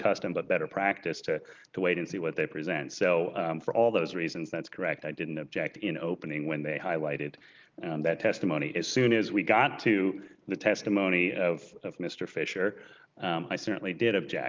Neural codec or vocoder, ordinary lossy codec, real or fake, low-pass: none; Opus, 32 kbps; real; 7.2 kHz